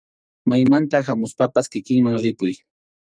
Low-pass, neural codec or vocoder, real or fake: 9.9 kHz; codec, 44.1 kHz, 2.6 kbps, SNAC; fake